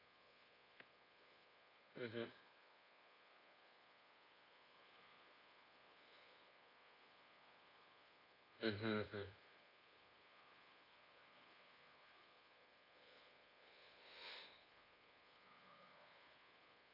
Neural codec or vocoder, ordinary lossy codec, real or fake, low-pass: codec, 24 kHz, 1.2 kbps, DualCodec; AAC, 24 kbps; fake; 5.4 kHz